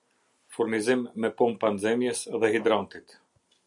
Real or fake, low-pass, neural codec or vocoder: real; 10.8 kHz; none